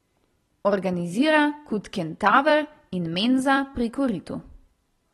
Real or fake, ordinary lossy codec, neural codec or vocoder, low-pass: fake; AAC, 32 kbps; vocoder, 44.1 kHz, 128 mel bands every 256 samples, BigVGAN v2; 19.8 kHz